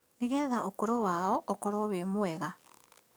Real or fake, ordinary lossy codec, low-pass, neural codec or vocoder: fake; none; none; codec, 44.1 kHz, 7.8 kbps, DAC